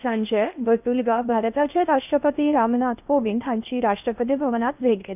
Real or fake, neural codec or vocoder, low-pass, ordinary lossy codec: fake; codec, 16 kHz in and 24 kHz out, 0.8 kbps, FocalCodec, streaming, 65536 codes; 3.6 kHz; none